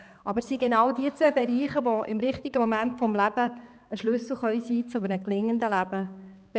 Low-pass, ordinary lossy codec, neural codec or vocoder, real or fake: none; none; codec, 16 kHz, 4 kbps, X-Codec, HuBERT features, trained on balanced general audio; fake